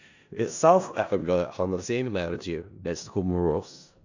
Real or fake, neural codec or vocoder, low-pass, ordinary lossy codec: fake; codec, 16 kHz in and 24 kHz out, 0.4 kbps, LongCat-Audio-Codec, four codebook decoder; 7.2 kHz; AAC, 48 kbps